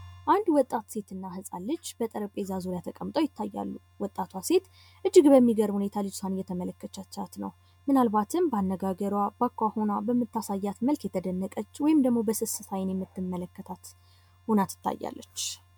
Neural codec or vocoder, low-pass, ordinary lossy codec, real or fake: none; 19.8 kHz; MP3, 96 kbps; real